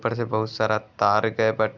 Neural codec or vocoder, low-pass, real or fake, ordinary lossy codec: none; 7.2 kHz; real; none